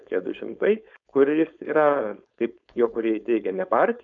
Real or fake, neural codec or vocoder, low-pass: fake; codec, 16 kHz, 4.8 kbps, FACodec; 7.2 kHz